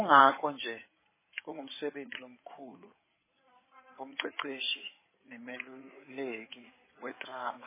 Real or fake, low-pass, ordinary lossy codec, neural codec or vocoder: fake; 3.6 kHz; MP3, 16 kbps; codec, 16 kHz in and 24 kHz out, 2.2 kbps, FireRedTTS-2 codec